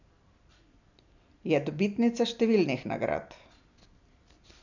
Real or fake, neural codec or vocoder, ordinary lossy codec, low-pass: real; none; none; 7.2 kHz